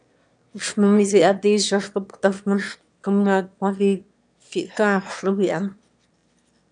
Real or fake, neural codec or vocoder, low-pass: fake; autoencoder, 22.05 kHz, a latent of 192 numbers a frame, VITS, trained on one speaker; 9.9 kHz